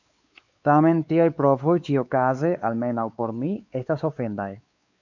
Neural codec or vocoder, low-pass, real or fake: codec, 16 kHz, 4 kbps, X-Codec, WavLM features, trained on Multilingual LibriSpeech; 7.2 kHz; fake